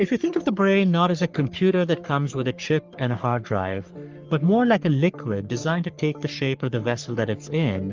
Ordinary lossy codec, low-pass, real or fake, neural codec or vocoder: Opus, 24 kbps; 7.2 kHz; fake; codec, 44.1 kHz, 3.4 kbps, Pupu-Codec